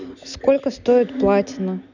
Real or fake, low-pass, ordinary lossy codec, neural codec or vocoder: real; 7.2 kHz; none; none